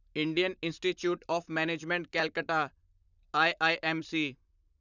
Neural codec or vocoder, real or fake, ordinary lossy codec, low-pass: vocoder, 44.1 kHz, 128 mel bands, Pupu-Vocoder; fake; none; 7.2 kHz